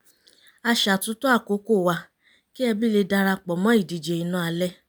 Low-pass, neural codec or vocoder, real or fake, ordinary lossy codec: none; none; real; none